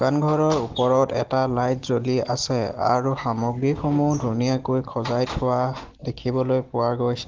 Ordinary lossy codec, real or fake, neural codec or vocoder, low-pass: Opus, 16 kbps; real; none; 7.2 kHz